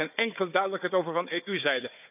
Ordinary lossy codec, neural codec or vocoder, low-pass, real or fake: none; codec, 16 kHz, 4 kbps, FunCodec, trained on Chinese and English, 50 frames a second; 3.6 kHz; fake